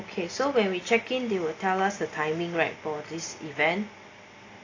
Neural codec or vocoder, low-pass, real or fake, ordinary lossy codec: none; 7.2 kHz; real; AAC, 32 kbps